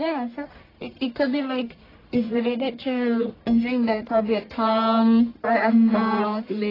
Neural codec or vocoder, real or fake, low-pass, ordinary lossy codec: codec, 44.1 kHz, 1.7 kbps, Pupu-Codec; fake; 5.4 kHz; AAC, 24 kbps